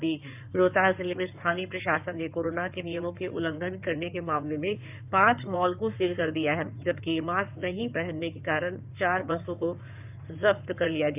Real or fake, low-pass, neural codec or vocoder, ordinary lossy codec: fake; 3.6 kHz; codec, 16 kHz in and 24 kHz out, 2.2 kbps, FireRedTTS-2 codec; none